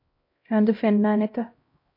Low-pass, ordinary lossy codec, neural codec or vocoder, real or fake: 5.4 kHz; MP3, 32 kbps; codec, 16 kHz, 0.5 kbps, X-Codec, HuBERT features, trained on LibriSpeech; fake